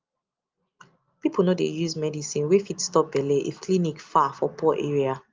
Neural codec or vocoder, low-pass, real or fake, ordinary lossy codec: none; 7.2 kHz; real; Opus, 32 kbps